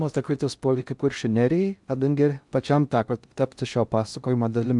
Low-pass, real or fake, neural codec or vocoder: 10.8 kHz; fake; codec, 16 kHz in and 24 kHz out, 0.6 kbps, FocalCodec, streaming, 4096 codes